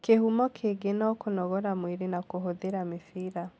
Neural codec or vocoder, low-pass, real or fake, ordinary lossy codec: none; none; real; none